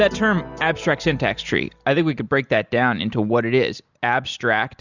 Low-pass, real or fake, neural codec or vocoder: 7.2 kHz; real; none